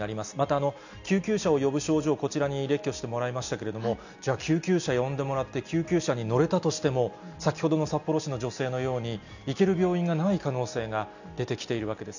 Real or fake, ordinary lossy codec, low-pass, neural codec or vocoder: real; none; 7.2 kHz; none